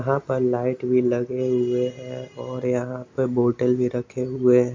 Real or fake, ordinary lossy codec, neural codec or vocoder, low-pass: real; MP3, 64 kbps; none; 7.2 kHz